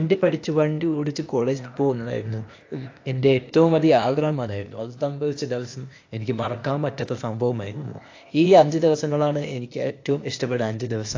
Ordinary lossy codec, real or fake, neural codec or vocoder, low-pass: AAC, 48 kbps; fake; codec, 16 kHz, 0.8 kbps, ZipCodec; 7.2 kHz